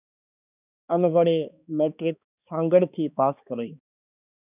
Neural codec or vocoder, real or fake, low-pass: codec, 16 kHz, 2 kbps, X-Codec, HuBERT features, trained on balanced general audio; fake; 3.6 kHz